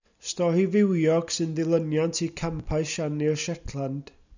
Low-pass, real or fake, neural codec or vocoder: 7.2 kHz; real; none